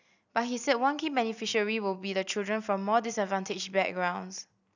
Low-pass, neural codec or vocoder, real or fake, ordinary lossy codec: 7.2 kHz; none; real; none